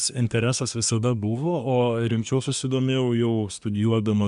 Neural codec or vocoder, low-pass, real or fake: codec, 24 kHz, 1 kbps, SNAC; 10.8 kHz; fake